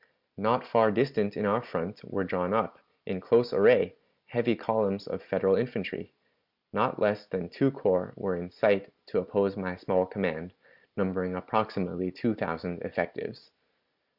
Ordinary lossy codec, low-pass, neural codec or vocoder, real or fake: Opus, 64 kbps; 5.4 kHz; none; real